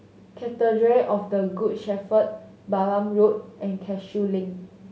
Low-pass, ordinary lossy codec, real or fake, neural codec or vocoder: none; none; real; none